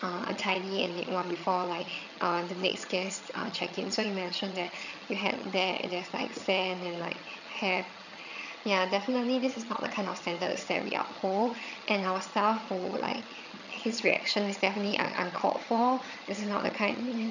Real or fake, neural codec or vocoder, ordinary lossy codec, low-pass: fake; vocoder, 22.05 kHz, 80 mel bands, HiFi-GAN; none; 7.2 kHz